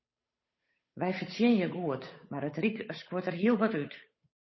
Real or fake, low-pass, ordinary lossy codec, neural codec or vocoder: fake; 7.2 kHz; MP3, 24 kbps; codec, 16 kHz, 8 kbps, FunCodec, trained on Chinese and English, 25 frames a second